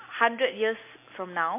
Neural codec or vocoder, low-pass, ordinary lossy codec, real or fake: none; 3.6 kHz; MP3, 24 kbps; real